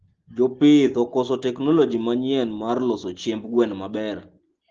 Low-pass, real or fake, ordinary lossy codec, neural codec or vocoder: 7.2 kHz; real; Opus, 16 kbps; none